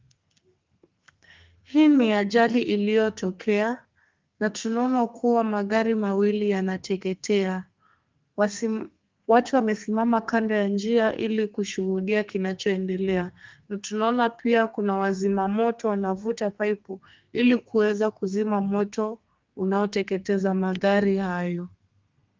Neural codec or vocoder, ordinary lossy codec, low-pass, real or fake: codec, 32 kHz, 1.9 kbps, SNAC; Opus, 32 kbps; 7.2 kHz; fake